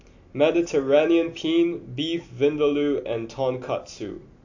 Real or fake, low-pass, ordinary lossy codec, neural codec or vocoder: real; 7.2 kHz; AAC, 48 kbps; none